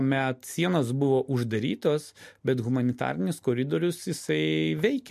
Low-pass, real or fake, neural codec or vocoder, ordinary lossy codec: 14.4 kHz; real; none; MP3, 64 kbps